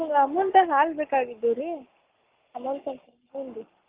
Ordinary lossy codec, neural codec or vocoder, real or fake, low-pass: Opus, 32 kbps; codec, 44.1 kHz, 7.8 kbps, Pupu-Codec; fake; 3.6 kHz